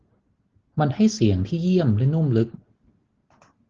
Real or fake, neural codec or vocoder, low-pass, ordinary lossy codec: real; none; 7.2 kHz; Opus, 16 kbps